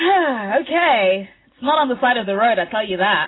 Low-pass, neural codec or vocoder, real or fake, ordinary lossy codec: 7.2 kHz; none; real; AAC, 16 kbps